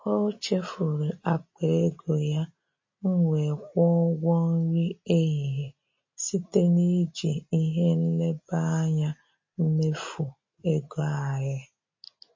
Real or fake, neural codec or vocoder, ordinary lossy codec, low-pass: real; none; MP3, 32 kbps; 7.2 kHz